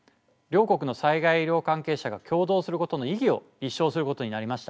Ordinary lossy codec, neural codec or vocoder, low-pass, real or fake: none; none; none; real